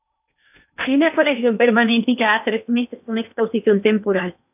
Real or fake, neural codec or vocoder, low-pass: fake; codec, 16 kHz in and 24 kHz out, 0.8 kbps, FocalCodec, streaming, 65536 codes; 3.6 kHz